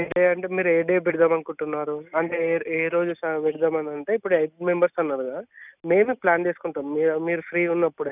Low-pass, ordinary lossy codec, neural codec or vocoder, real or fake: 3.6 kHz; none; none; real